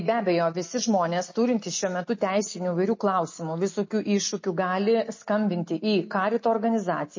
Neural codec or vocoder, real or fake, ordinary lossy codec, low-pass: none; real; MP3, 32 kbps; 7.2 kHz